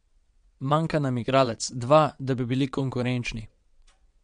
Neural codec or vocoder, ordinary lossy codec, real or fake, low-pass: vocoder, 22.05 kHz, 80 mel bands, WaveNeXt; MP3, 64 kbps; fake; 9.9 kHz